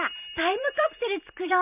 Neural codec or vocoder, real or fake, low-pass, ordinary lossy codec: vocoder, 44.1 kHz, 128 mel bands every 512 samples, BigVGAN v2; fake; 3.6 kHz; none